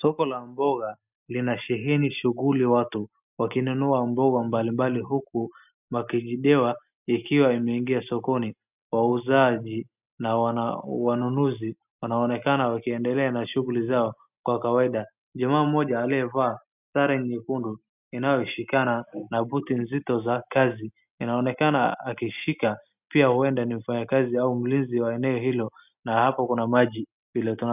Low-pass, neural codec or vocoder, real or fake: 3.6 kHz; none; real